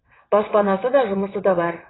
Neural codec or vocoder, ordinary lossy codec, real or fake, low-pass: vocoder, 22.05 kHz, 80 mel bands, WaveNeXt; AAC, 16 kbps; fake; 7.2 kHz